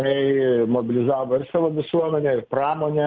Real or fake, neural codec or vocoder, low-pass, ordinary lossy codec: real; none; 7.2 kHz; Opus, 24 kbps